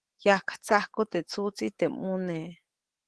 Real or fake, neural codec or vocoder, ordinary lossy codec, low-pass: fake; codec, 24 kHz, 3.1 kbps, DualCodec; Opus, 16 kbps; 10.8 kHz